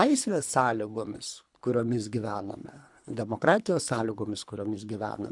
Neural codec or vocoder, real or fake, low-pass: codec, 24 kHz, 3 kbps, HILCodec; fake; 10.8 kHz